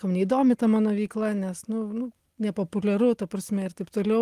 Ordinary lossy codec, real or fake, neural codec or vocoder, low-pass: Opus, 24 kbps; real; none; 14.4 kHz